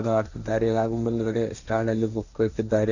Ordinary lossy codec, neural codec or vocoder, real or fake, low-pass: none; codec, 16 kHz, 1.1 kbps, Voila-Tokenizer; fake; 7.2 kHz